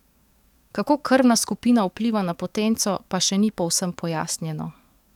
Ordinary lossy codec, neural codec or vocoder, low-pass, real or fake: none; codec, 44.1 kHz, 7.8 kbps, DAC; 19.8 kHz; fake